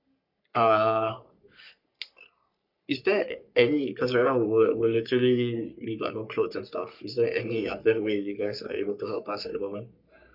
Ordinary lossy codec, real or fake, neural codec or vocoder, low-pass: none; fake; codec, 44.1 kHz, 3.4 kbps, Pupu-Codec; 5.4 kHz